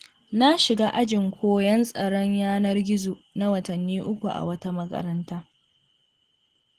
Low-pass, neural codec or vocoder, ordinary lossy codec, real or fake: 14.4 kHz; none; Opus, 16 kbps; real